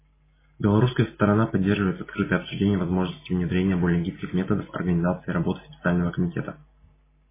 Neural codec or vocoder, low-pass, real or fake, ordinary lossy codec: none; 3.6 kHz; real; MP3, 16 kbps